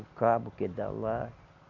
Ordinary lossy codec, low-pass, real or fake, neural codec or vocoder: none; 7.2 kHz; real; none